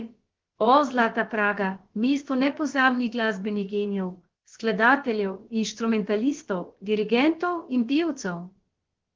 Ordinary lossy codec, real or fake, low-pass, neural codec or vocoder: Opus, 16 kbps; fake; 7.2 kHz; codec, 16 kHz, about 1 kbps, DyCAST, with the encoder's durations